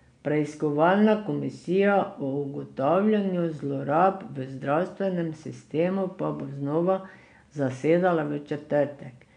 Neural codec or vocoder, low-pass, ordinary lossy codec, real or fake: none; 9.9 kHz; none; real